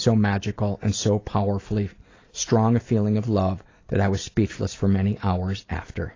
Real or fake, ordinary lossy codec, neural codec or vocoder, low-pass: real; AAC, 32 kbps; none; 7.2 kHz